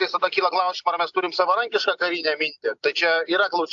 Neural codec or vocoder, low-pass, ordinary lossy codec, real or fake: none; 7.2 kHz; AAC, 64 kbps; real